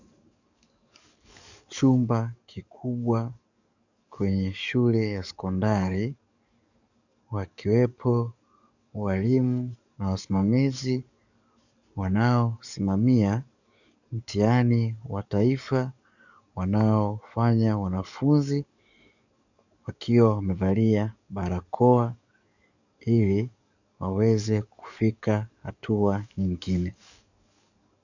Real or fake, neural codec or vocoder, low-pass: fake; codec, 44.1 kHz, 7.8 kbps, DAC; 7.2 kHz